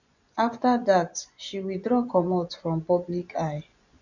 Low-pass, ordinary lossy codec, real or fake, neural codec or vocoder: 7.2 kHz; none; fake; vocoder, 24 kHz, 100 mel bands, Vocos